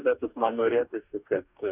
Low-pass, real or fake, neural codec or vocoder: 3.6 kHz; fake; codec, 44.1 kHz, 2.6 kbps, DAC